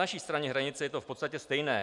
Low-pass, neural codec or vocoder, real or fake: 10.8 kHz; none; real